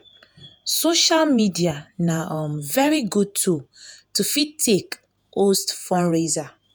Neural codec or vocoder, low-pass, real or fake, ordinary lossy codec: vocoder, 48 kHz, 128 mel bands, Vocos; none; fake; none